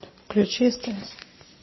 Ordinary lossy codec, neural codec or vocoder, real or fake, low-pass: MP3, 24 kbps; none; real; 7.2 kHz